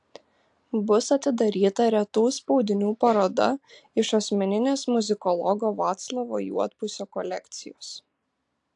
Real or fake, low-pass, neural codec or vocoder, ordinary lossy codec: real; 10.8 kHz; none; AAC, 64 kbps